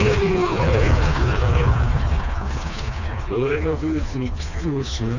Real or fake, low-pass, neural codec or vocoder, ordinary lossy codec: fake; 7.2 kHz; codec, 16 kHz, 2 kbps, FreqCodec, smaller model; none